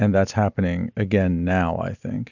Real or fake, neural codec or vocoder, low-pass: real; none; 7.2 kHz